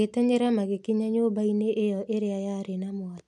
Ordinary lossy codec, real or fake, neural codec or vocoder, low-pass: none; real; none; none